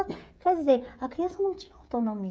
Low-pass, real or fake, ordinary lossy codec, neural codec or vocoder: none; fake; none; codec, 16 kHz, 16 kbps, FreqCodec, smaller model